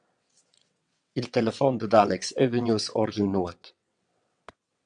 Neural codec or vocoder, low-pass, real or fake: vocoder, 22.05 kHz, 80 mel bands, WaveNeXt; 9.9 kHz; fake